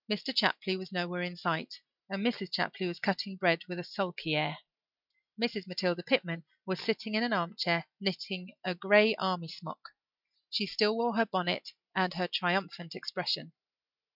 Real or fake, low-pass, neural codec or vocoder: real; 5.4 kHz; none